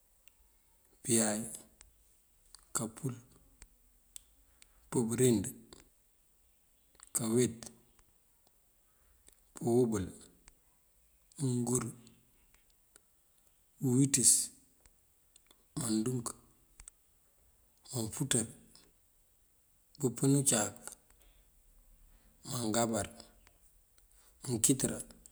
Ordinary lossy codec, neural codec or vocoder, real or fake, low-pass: none; none; real; none